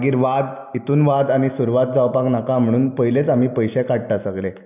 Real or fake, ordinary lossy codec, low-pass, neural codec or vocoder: real; none; 3.6 kHz; none